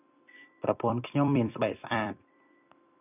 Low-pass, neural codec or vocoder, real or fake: 3.6 kHz; vocoder, 44.1 kHz, 128 mel bands every 256 samples, BigVGAN v2; fake